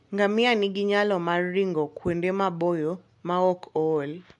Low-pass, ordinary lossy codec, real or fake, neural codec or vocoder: 10.8 kHz; MP3, 64 kbps; real; none